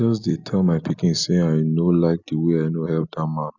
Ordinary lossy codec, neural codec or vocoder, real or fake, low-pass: none; none; real; 7.2 kHz